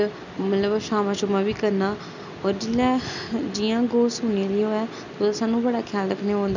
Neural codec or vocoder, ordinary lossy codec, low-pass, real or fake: none; none; 7.2 kHz; real